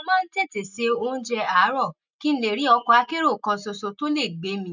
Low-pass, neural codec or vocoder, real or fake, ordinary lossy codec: 7.2 kHz; none; real; none